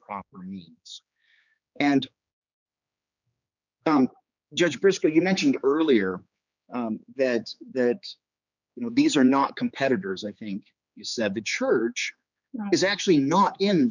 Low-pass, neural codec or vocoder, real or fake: 7.2 kHz; codec, 16 kHz, 4 kbps, X-Codec, HuBERT features, trained on general audio; fake